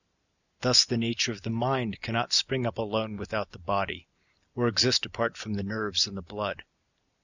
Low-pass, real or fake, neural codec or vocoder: 7.2 kHz; real; none